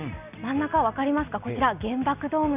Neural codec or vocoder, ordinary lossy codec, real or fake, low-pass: none; none; real; 3.6 kHz